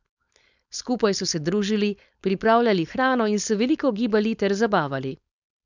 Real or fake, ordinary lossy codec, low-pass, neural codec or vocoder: fake; none; 7.2 kHz; codec, 16 kHz, 4.8 kbps, FACodec